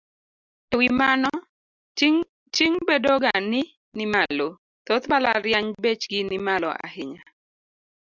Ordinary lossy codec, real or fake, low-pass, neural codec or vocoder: Opus, 64 kbps; real; 7.2 kHz; none